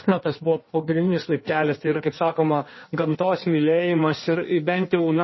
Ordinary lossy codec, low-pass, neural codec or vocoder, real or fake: MP3, 24 kbps; 7.2 kHz; codec, 16 kHz in and 24 kHz out, 1.1 kbps, FireRedTTS-2 codec; fake